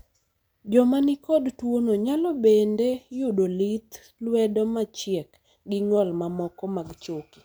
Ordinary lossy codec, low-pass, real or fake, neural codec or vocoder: none; none; real; none